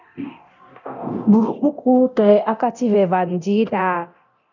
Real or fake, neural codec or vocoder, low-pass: fake; codec, 24 kHz, 0.9 kbps, DualCodec; 7.2 kHz